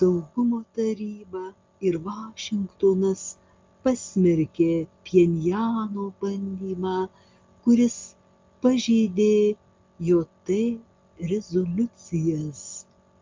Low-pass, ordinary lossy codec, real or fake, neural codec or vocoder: 7.2 kHz; Opus, 24 kbps; real; none